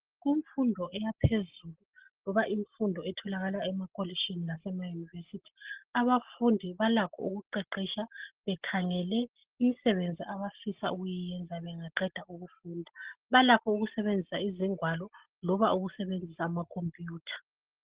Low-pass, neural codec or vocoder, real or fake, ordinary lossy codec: 3.6 kHz; none; real; Opus, 16 kbps